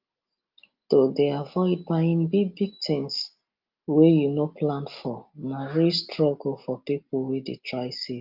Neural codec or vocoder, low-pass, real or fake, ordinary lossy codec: none; 5.4 kHz; real; Opus, 24 kbps